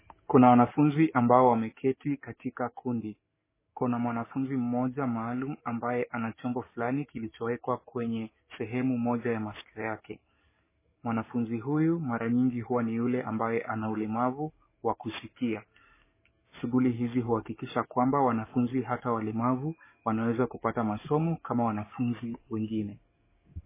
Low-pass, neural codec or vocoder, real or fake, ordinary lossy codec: 3.6 kHz; codec, 44.1 kHz, 7.8 kbps, DAC; fake; MP3, 16 kbps